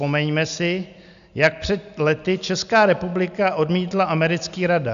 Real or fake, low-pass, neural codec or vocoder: real; 7.2 kHz; none